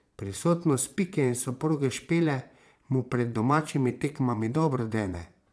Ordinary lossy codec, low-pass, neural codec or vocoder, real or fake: none; none; vocoder, 22.05 kHz, 80 mel bands, Vocos; fake